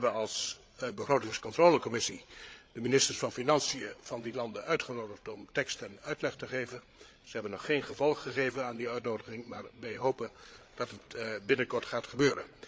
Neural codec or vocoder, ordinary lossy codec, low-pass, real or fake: codec, 16 kHz, 8 kbps, FreqCodec, larger model; none; none; fake